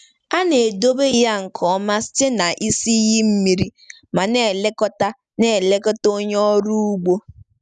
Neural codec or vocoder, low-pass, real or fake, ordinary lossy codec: none; 10.8 kHz; real; none